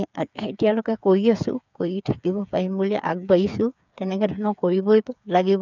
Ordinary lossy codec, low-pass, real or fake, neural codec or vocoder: none; 7.2 kHz; fake; codec, 16 kHz, 8 kbps, FreqCodec, smaller model